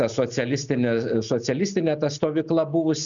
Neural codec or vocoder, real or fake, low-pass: none; real; 7.2 kHz